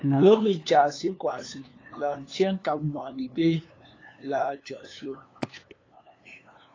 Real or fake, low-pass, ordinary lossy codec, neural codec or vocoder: fake; 7.2 kHz; AAC, 32 kbps; codec, 16 kHz, 2 kbps, FunCodec, trained on LibriTTS, 25 frames a second